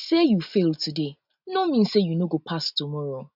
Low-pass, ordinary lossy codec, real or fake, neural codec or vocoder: 5.4 kHz; none; real; none